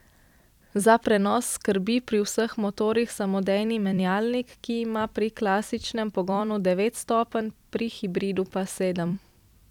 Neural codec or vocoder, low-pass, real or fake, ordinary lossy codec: vocoder, 44.1 kHz, 128 mel bands every 256 samples, BigVGAN v2; 19.8 kHz; fake; none